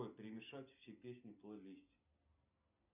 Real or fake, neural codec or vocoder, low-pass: real; none; 3.6 kHz